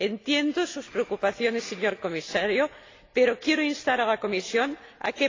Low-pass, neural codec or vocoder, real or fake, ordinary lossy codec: 7.2 kHz; none; real; AAC, 32 kbps